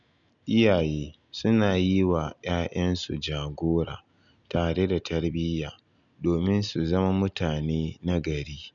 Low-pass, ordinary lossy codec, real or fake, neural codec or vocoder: 7.2 kHz; none; real; none